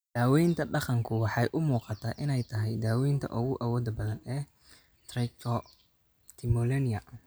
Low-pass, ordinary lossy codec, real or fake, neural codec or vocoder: none; none; real; none